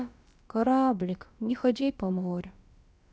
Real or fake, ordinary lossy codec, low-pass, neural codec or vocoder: fake; none; none; codec, 16 kHz, about 1 kbps, DyCAST, with the encoder's durations